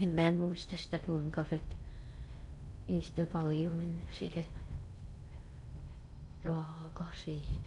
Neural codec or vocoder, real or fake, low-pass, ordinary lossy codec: codec, 16 kHz in and 24 kHz out, 0.6 kbps, FocalCodec, streaming, 4096 codes; fake; 10.8 kHz; none